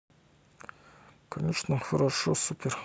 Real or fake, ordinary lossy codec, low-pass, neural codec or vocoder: real; none; none; none